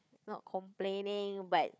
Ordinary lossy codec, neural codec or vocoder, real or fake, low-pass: none; codec, 16 kHz, 4 kbps, FunCodec, trained on Chinese and English, 50 frames a second; fake; none